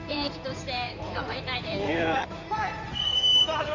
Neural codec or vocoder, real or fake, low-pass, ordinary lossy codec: codec, 16 kHz in and 24 kHz out, 2.2 kbps, FireRedTTS-2 codec; fake; 7.2 kHz; none